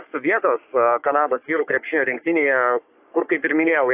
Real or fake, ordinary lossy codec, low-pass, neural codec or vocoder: fake; AAC, 32 kbps; 3.6 kHz; codec, 44.1 kHz, 3.4 kbps, Pupu-Codec